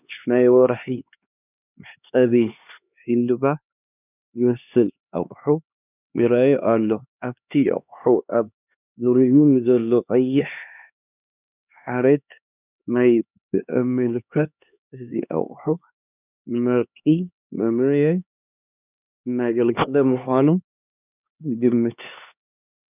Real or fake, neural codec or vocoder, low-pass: fake; codec, 16 kHz, 2 kbps, X-Codec, HuBERT features, trained on LibriSpeech; 3.6 kHz